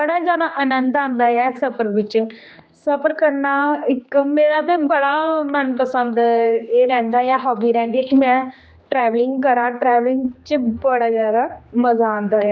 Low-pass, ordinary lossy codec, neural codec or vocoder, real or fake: none; none; codec, 16 kHz, 2 kbps, X-Codec, HuBERT features, trained on general audio; fake